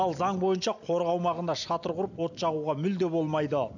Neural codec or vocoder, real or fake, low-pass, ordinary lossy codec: none; real; 7.2 kHz; none